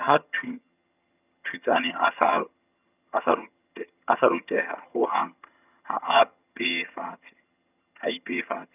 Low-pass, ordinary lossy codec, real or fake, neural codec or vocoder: 3.6 kHz; none; fake; vocoder, 22.05 kHz, 80 mel bands, HiFi-GAN